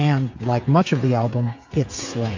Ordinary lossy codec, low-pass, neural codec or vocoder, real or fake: AAC, 48 kbps; 7.2 kHz; codec, 16 kHz, 8 kbps, FreqCodec, smaller model; fake